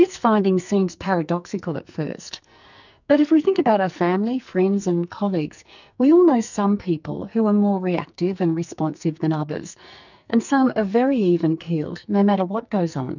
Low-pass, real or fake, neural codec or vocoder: 7.2 kHz; fake; codec, 44.1 kHz, 2.6 kbps, SNAC